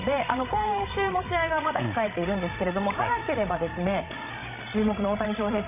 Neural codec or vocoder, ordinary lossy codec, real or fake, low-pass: codec, 16 kHz, 16 kbps, FreqCodec, larger model; none; fake; 3.6 kHz